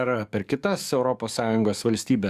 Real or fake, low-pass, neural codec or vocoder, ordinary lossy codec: fake; 14.4 kHz; codec, 44.1 kHz, 7.8 kbps, DAC; Opus, 64 kbps